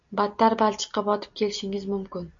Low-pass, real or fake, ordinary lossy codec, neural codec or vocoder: 7.2 kHz; real; MP3, 48 kbps; none